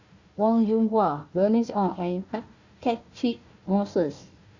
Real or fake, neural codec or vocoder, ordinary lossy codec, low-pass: fake; codec, 16 kHz, 1 kbps, FunCodec, trained on Chinese and English, 50 frames a second; Opus, 64 kbps; 7.2 kHz